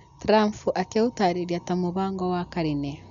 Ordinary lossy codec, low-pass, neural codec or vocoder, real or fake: MP3, 96 kbps; 7.2 kHz; none; real